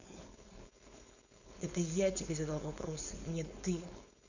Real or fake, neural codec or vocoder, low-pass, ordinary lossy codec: fake; codec, 16 kHz, 4.8 kbps, FACodec; 7.2 kHz; none